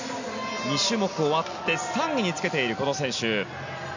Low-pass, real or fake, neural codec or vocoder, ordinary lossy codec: 7.2 kHz; real; none; none